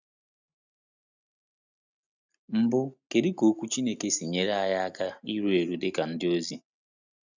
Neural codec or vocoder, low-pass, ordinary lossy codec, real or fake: none; 7.2 kHz; none; real